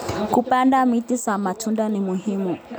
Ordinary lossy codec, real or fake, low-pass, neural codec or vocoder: none; real; none; none